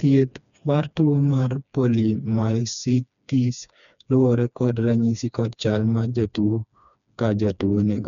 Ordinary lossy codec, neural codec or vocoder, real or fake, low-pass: none; codec, 16 kHz, 2 kbps, FreqCodec, smaller model; fake; 7.2 kHz